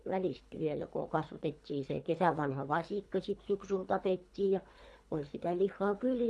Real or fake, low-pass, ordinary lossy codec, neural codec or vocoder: fake; none; none; codec, 24 kHz, 3 kbps, HILCodec